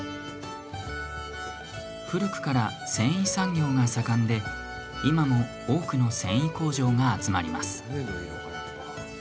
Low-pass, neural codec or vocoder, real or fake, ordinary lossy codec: none; none; real; none